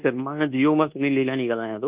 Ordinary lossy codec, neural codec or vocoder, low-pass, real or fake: Opus, 32 kbps; codec, 24 kHz, 1.2 kbps, DualCodec; 3.6 kHz; fake